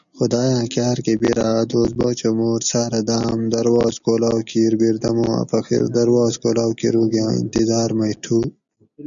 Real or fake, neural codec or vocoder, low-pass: real; none; 7.2 kHz